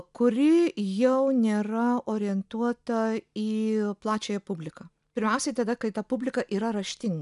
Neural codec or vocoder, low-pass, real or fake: none; 10.8 kHz; real